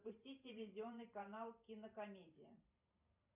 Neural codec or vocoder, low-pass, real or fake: vocoder, 44.1 kHz, 128 mel bands every 512 samples, BigVGAN v2; 3.6 kHz; fake